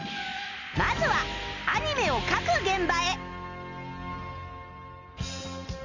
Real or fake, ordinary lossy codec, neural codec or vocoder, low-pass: real; none; none; 7.2 kHz